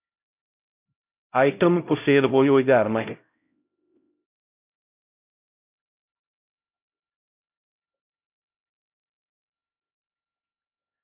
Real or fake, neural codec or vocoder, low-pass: fake; codec, 16 kHz, 0.5 kbps, X-Codec, HuBERT features, trained on LibriSpeech; 3.6 kHz